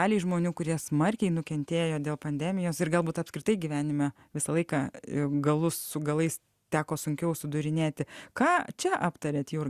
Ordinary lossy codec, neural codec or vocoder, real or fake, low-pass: Opus, 64 kbps; none; real; 14.4 kHz